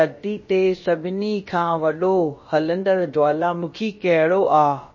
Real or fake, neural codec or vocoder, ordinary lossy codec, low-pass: fake; codec, 16 kHz, about 1 kbps, DyCAST, with the encoder's durations; MP3, 32 kbps; 7.2 kHz